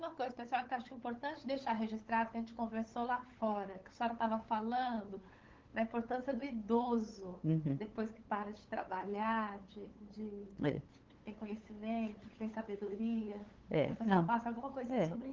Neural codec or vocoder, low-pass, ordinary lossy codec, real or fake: codec, 16 kHz, 8 kbps, FunCodec, trained on LibriTTS, 25 frames a second; 7.2 kHz; Opus, 16 kbps; fake